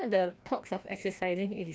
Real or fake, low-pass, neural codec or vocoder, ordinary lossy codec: fake; none; codec, 16 kHz, 1 kbps, FreqCodec, larger model; none